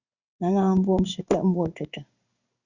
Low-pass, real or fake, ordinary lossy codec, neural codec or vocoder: 7.2 kHz; fake; Opus, 64 kbps; codec, 16 kHz in and 24 kHz out, 1 kbps, XY-Tokenizer